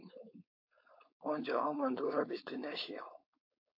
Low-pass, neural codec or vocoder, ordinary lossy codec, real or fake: 5.4 kHz; codec, 16 kHz, 4.8 kbps, FACodec; AAC, 32 kbps; fake